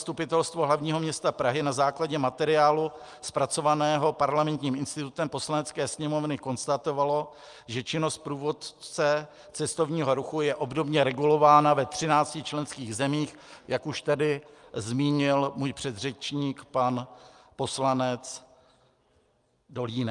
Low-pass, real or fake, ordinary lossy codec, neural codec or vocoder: 10.8 kHz; real; Opus, 32 kbps; none